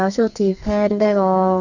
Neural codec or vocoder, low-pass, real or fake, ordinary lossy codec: codec, 44.1 kHz, 2.6 kbps, SNAC; 7.2 kHz; fake; none